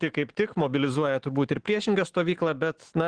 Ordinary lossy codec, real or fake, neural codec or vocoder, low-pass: Opus, 16 kbps; fake; autoencoder, 48 kHz, 128 numbers a frame, DAC-VAE, trained on Japanese speech; 9.9 kHz